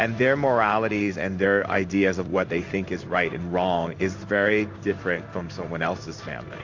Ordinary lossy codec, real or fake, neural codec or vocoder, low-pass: AAC, 48 kbps; fake; codec, 16 kHz in and 24 kHz out, 1 kbps, XY-Tokenizer; 7.2 kHz